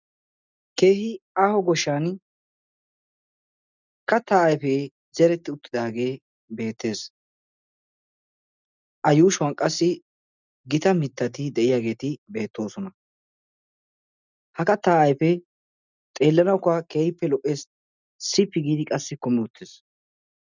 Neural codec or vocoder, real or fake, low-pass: none; real; 7.2 kHz